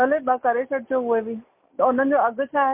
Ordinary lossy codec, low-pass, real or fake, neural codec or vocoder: MP3, 24 kbps; 3.6 kHz; real; none